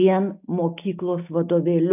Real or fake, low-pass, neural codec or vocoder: real; 3.6 kHz; none